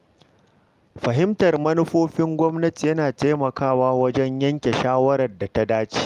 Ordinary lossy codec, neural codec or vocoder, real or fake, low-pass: Opus, 32 kbps; none; real; 14.4 kHz